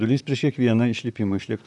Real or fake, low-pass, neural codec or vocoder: fake; 10.8 kHz; vocoder, 44.1 kHz, 128 mel bands, Pupu-Vocoder